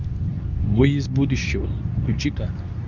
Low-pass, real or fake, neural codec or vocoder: 7.2 kHz; fake; codec, 24 kHz, 0.9 kbps, WavTokenizer, medium speech release version 2